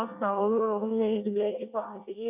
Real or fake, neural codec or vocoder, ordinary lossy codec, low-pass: fake; codec, 24 kHz, 1 kbps, SNAC; none; 3.6 kHz